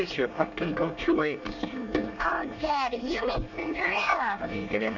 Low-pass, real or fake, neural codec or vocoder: 7.2 kHz; fake; codec, 24 kHz, 1 kbps, SNAC